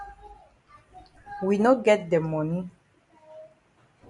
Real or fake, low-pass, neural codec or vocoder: real; 10.8 kHz; none